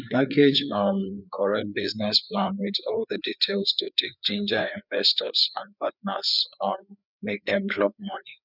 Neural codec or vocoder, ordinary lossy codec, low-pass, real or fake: codec, 16 kHz, 4 kbps, FreqCodec, larger model; none; 5.4 kHz; fake